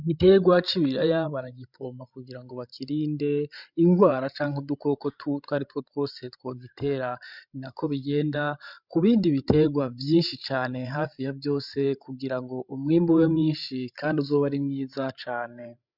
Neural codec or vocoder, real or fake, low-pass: codec, 16 kHz, 16 kbps, FreqCodec, larger model; fake; 5.4 kHz